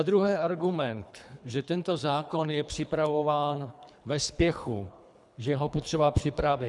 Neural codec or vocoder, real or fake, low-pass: codec, 24 kHz, 3 kbps, HILCodec; fake; 10.8 kHz